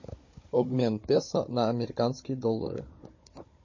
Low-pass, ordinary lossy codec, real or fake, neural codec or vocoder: 7.2 kHz; MP3, 32 kbps; fake; codec, 16 kHz, 16 kbps, FunCodec, trained on Chinese and English, 50 frames a second